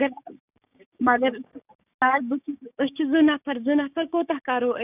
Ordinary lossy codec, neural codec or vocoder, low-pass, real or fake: none; codec, 16 kHz, 6 kbps, DAC; 3.6 kHz; fake